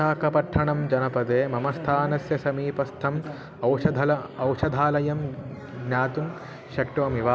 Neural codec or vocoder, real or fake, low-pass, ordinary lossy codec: none; real; none; none